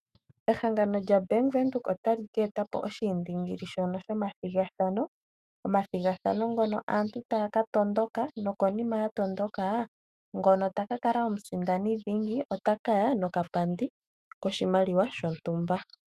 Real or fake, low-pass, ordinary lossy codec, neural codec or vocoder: fake; 14.4 kHz; Opus, 64 kbps; autoencoder, 48 kHz, 128 numbers a frame, DAC-VAE, trained on Japanese speech